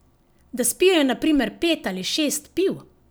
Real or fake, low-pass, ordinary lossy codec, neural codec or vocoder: real; none; none; none